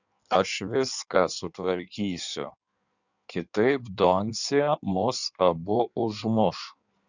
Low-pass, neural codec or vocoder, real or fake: 7.2 kHz; codec, 16 kHz in and 24 kHz out, 1.1 kbps, FireRedTTS-2 codec; fake